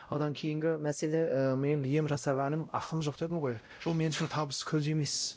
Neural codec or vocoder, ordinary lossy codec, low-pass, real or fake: codec, 16 kHz, 0.5 kbps, X-Codec, WavLM features, trained on Multilingual LibriSpeech; none; none; fake